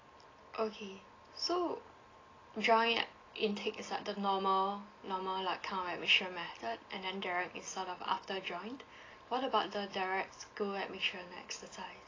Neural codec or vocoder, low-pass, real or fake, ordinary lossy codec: none; 7.2 kHz; real; AAC, 32 kbps